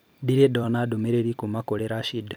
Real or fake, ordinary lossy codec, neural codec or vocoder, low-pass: fake; none; vocoder, 44.1 kHz, 128 mel bands every 256 samples, BigVGAN v2; none